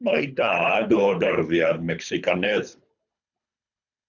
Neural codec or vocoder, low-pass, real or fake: codec, 24 kHz, 3 kbps, HILCodec; 7.2 kHz; fake